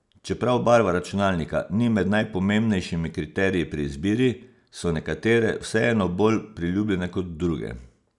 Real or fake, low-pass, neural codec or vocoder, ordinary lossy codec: real; 10.8 kHz; none; none